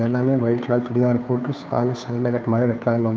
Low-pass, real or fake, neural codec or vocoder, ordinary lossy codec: none; fake; codec, 16 kHz, 2 kbps, FunCodec, trained on Chinese and English, 25 frames a second; none